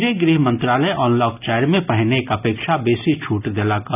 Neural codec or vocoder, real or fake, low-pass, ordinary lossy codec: none; real; 3.6 kHz; none